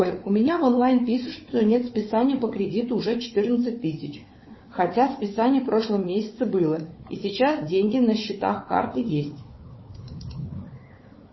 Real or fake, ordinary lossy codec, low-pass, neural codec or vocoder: fake; MP3, 24 kbps; 7.2 kHz; codec, 16 kHz, 4 kbps, FunCodec, trained on Chinese and English, 50 frames a second